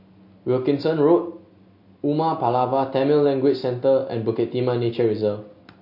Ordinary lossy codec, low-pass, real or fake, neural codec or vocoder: MP3, 32 kbps; 5.4 kHz; real; none